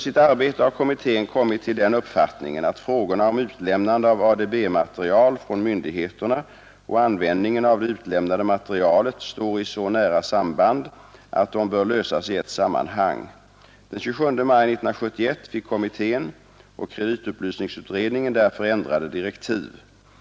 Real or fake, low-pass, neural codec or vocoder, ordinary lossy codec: real; none; none; none